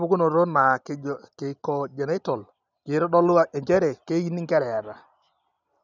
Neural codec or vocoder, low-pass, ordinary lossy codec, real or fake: none; 7.2 kHz; none; real